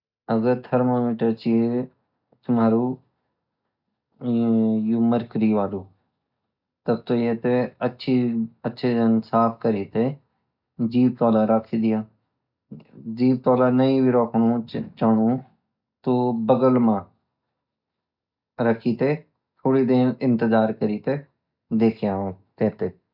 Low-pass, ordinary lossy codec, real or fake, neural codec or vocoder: 5.4 kHz; none; real; none